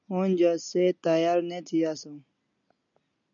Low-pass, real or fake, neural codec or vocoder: 7.2 kHz; real; none